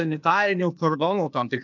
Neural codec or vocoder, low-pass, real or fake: codec, 16 kHz, 0.8 kbps, ZipCodec; 7.2 kHz; fake